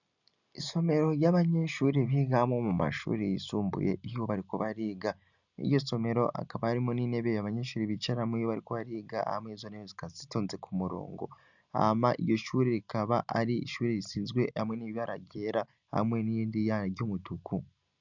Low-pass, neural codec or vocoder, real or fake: 7.2 kHz; none; real